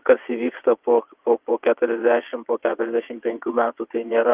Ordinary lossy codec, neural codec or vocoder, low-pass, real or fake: Opus, 32 kbps; vocoder, 22.05 kHz, 80 mel bands, WaveNeXt; 3.6 kHz; fake